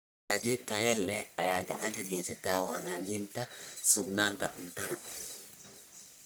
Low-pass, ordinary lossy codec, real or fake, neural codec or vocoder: none; none; fake; codec, 44.1 kHz, 1.7 kbps, Pupu-Codec